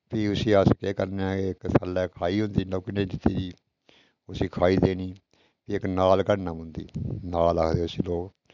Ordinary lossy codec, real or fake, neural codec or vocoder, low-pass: none; real; none; 7.2 kHz